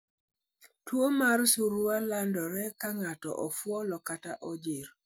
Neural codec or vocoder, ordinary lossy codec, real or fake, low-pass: none; none; real; none